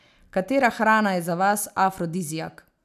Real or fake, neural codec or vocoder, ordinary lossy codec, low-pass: real; none; none; 14.4 kHz